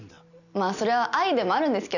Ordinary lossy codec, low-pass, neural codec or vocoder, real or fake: none; 7.2 kHz; none; real